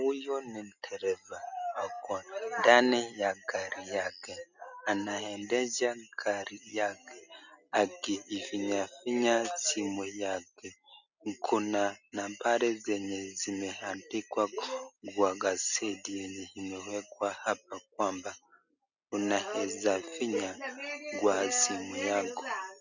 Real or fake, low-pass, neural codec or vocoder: real; 7.2 kHz; none